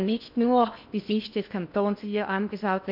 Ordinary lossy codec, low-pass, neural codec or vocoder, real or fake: MP3, 48 kbps; 5.4 kHz; codec, 16 kHz in and 24 kHz out, 0.6 kbps, FocalCodec, streaming, 4096 codes; fake